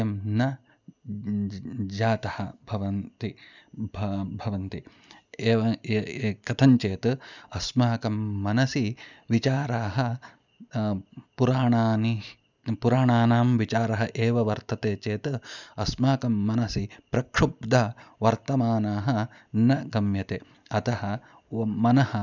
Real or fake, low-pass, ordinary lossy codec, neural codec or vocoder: real; 7.2 kHz; none; none